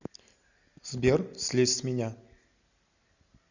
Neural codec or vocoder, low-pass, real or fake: none; 7.2 kHz; real